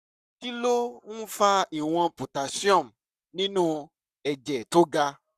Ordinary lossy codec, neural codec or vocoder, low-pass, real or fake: none; codec, 44.1 kHz, 7.8 kbps, Pupu-Codec; 14.4 kHz; fake